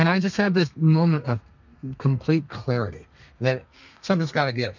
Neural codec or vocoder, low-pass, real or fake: codec, 32 kHz, 1.9 kbps, SNAC; 7.2 kHz; fake